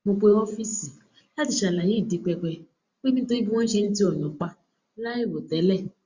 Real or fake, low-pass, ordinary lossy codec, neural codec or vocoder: fake; 7.2 kHz; Opus, 64 kbps; vocoder, 44.1 kHz, 128 mel bands every 512 samples, BigVGAN v2